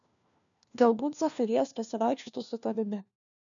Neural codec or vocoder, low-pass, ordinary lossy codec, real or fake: codec, 16 kHz, 1 kbps, FunCodec, trained on LibriTTS, 50 frames a second; 7.2 kHz; MP3, 96 kbps; fake